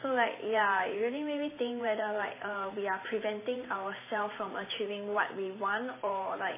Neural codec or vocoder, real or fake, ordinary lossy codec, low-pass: vocoder, 44.1 kHz, 128 mel bands every 512 samples, BigVGAN v2; fake; MP3, 16 kbps; 3.6 kHz